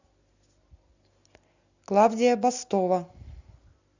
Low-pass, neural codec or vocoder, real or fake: 7.2 kHz; none; real